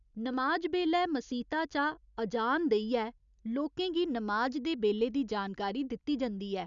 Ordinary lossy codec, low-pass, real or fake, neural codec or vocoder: none; 7.2 kHz; real; none